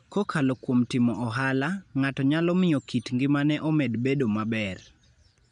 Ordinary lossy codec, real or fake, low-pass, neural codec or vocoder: none; real; 9.9 kHz; none